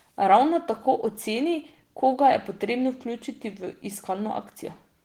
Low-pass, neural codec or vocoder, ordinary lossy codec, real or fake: 19.8 kHz; none; Opus, 16 kbps; real